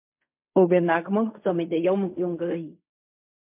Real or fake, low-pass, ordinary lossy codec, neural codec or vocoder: fake; 3.6 kHz; MP3, 32 kbps; codec, 16 kHz in and 24 kHz out, 0.4 kbps, LongCat-Audio-Codec, fine tuned four codebook decoder